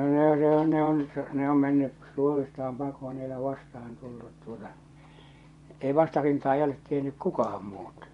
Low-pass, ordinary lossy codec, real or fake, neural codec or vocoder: 10.8 kHz; MP3, 64 kbps; fake; vocoder, 24 kHz, 100 mel bands, Vocos